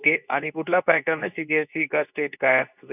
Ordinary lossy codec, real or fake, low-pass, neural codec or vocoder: none; fake; 3.6 kHz; codec, 24 kHz, 0.9 kbps, WavTokenizer, medium speech release version 2